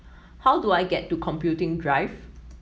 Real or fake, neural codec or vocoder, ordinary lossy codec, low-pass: real; none; none; none